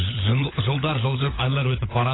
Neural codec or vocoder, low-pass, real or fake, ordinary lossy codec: codec, 16 kHz, 16 kbps, FunCodec, trained on LibriTTS, 50 frames a second; 7.2 kHz; fake; AAC, 16 kbps